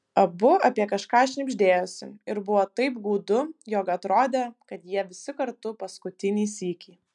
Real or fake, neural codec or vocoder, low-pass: real; none; 10.8 kHz